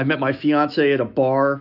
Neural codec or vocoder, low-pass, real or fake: autoencoder, 48 kHz, 128 numbers a frame, DAC-VAE, trained on Japanese speech; 5.4 kHz; fake